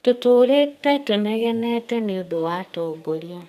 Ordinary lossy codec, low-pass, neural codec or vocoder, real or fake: AAC, 96 kbps; 14.4 kHz; codec, 44.1 kHz, 2.6 kbps, SNAC; fake